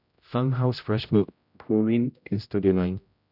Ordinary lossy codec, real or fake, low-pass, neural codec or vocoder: none; fake; 5.4 kHz; codec, 16 kHz, 0.5 kbps, X-Codec, HuBERT features, trained on general audio